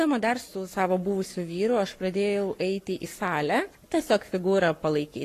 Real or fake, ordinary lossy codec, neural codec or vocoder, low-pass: fake; AAC, 48 kbps; codec, 44.1 kHz, 7.8 kbps, Pupu-Codec; 14.4 kHz